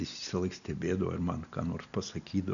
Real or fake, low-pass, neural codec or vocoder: real; 7.2 kHz; none